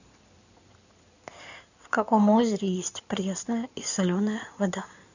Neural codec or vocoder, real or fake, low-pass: codec, 16 kHz in and 24 kHz out, 2.2 kbps, FireRedTTS-2 codec; fake; 7.2 kHz